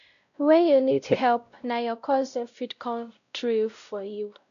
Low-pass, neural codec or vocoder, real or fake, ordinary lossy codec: 7.2 kHz; codec, 16 kHz, 0.5 kbps, X-Codec, WavLM features, trained on Multilingual LibriSpeech; fake; none